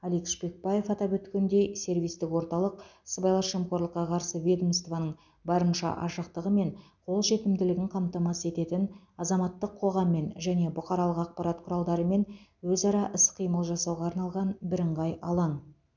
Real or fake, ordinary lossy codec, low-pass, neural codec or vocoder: real; none; 7.2 kHz; none